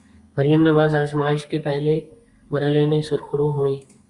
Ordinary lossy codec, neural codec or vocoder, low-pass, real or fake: Opus, 64 kbps; codec, 32 kHz, 1.9 kbps, SNAC; 10.8 kHz; fake